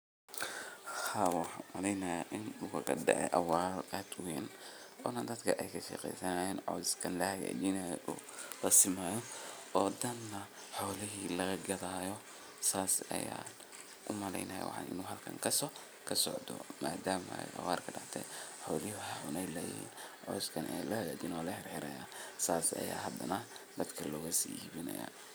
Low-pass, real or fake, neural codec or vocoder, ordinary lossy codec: none; real; none; none